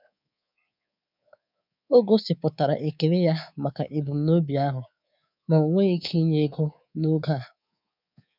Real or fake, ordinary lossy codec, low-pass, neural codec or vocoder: fake; none; 5.4 kHz; codec, 24 kHz, 3.1 kbps, DualCodec